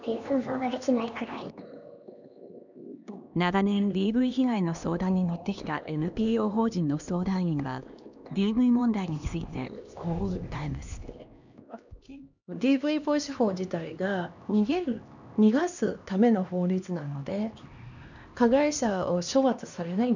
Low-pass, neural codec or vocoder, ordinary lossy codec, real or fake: 7.2 kHz; codec, 16 kHz, 2 kbps, X-Codec, HuBERT features, trained on LibriSpeech; none; fake